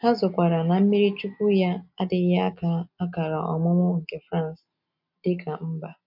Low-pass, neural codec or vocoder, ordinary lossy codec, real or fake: 5.4 kHz; none; MP3, 48 kbps; real